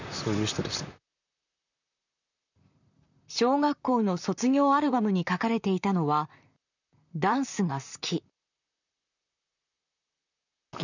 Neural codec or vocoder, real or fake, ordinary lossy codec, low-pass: vocoder, 44.1 kHz, 128 mel bands, Pupu-Vocoder; fake; none; 7.2 kHz